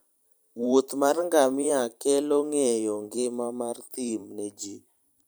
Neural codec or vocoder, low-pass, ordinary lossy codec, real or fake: vocoder, 44.1 kHz, 128 mel bands every 512 samples, BigVGAN v2; none; none; fake